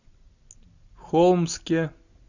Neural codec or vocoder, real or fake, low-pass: none; real; 7.2 kHz